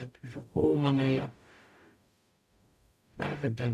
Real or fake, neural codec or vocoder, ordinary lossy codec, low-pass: fake; codec, 44.1 kHz, 0.9 kbps, DAC; none; 14.4 kHz